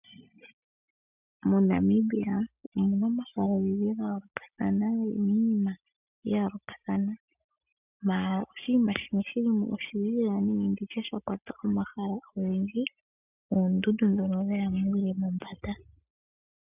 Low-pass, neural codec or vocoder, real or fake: 3.6 kHz; none; real